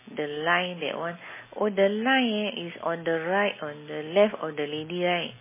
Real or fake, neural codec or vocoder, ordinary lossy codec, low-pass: real; none; MP3, 16 kbps; 3.6 kHz